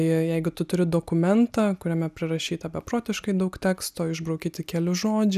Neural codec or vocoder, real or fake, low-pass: none; real; 14.4 kHz